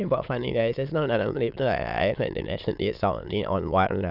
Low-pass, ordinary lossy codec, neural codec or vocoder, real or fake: 5.4 kHz; none; autoencoder, 22.05 kHz, a latent of 192 numbers a frame, VITS, trained on many speakers; fake